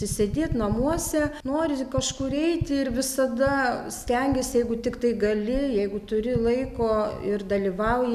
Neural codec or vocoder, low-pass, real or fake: none; 14.4 kHz; real